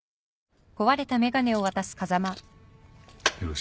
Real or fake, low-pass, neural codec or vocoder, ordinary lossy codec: real; none; none; none